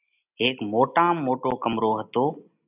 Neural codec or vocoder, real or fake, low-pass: none; real; 3.6 kHz